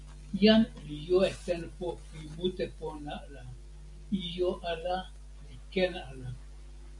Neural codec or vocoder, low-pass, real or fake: none; 10.8 kHz; real